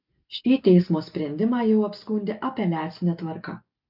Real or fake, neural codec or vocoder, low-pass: real; none; 5.4 kHz